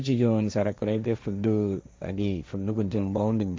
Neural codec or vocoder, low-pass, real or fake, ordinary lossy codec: codec, 16 kHz, 1.1 kbps, Voila-Tokenizer; none; fake; none